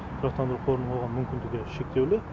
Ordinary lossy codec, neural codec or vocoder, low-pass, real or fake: none; none; none; real